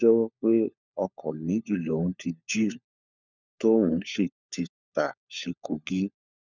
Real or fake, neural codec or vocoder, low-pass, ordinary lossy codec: fake; codec, 16 kHz, 4 kbps, FunCodec, trained on LibriTTS, 50 frames a second; 7.2 kHz; none